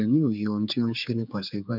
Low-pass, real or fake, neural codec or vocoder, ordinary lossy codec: 5.4 kHz; fake; codec, 16 kHz, 4 kbps, X-Codec, HuBERT features, trained on general audio; none